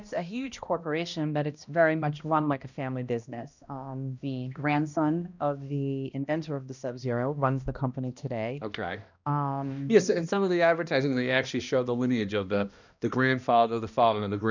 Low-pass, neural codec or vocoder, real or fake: 7.2 kHz; codec, 16 kHz, 1 kbps, X-Codec, HuBERT features, trained on balanced general audio; fake